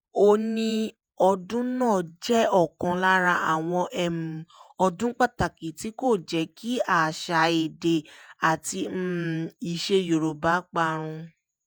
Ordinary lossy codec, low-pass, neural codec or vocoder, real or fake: none; none; vocoder, 48 kHz, 128 mel bands, Vocos; fake